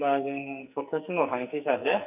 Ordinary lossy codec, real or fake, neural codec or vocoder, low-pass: none; fake; codec, 44.1 kHz, 2.6 kbps, SNAC; 3.6 kHz